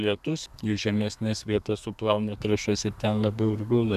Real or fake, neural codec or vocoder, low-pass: fake; codec, 32 kHz, 1.9 kbps, SNAC; 14.4 kHz